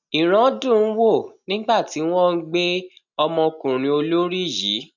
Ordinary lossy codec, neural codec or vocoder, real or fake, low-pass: none; none; real; 7.2 kHz